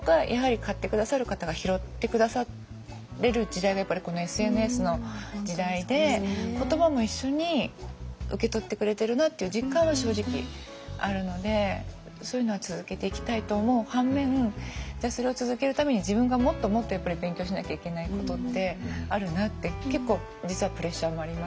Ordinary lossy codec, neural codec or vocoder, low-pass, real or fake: none; none; none; real